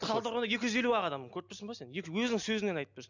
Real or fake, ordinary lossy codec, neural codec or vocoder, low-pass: real; none; none; 7.2 kHz